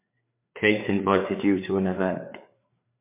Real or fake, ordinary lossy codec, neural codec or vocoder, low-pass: fake; MP3, 24 kbps; codec, 16 kHz, 4 kbps, FreqCodec, larger model; 3.6 kHz